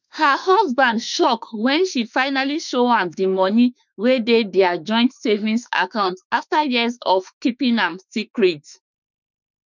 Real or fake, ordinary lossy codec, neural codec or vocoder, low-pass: fake; none; autoencoder, 48 kHz, 32 numbers a frame, DAC-VAE, trained on Japanese speech; 7.2 kHz